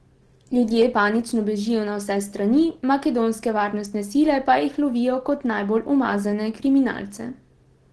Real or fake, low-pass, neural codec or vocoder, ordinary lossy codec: real; 10.8 kHz; none; Opus, 16 kbps